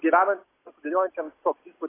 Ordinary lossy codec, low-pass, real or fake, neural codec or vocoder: AAC, 16 kbps; 3.6 kHz; real; none